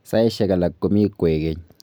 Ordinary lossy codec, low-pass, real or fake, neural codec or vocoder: none; none; real; none